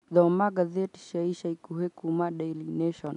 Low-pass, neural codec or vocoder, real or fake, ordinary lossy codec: 10.8 kHz; none; real; none